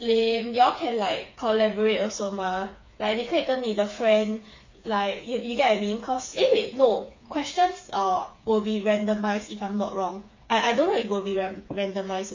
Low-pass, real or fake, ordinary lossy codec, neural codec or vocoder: 7.2 kHz; fake; MP3, 48 kbps; codec, 16 kHz, 4 kbps, FreqCodec, smaller model